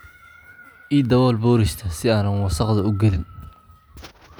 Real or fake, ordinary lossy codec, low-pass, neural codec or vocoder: real; none; none; none